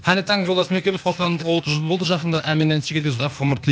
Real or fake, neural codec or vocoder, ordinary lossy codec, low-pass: fake; codec, 16 kHz, 0.8 kbps, ZipCodec; none; none